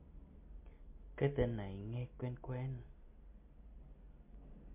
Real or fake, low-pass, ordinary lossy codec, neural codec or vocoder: real; 3.6 kHz; MP3, 24 kbps; none